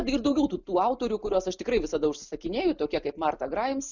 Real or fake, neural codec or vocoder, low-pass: real; none; 7.2 kHz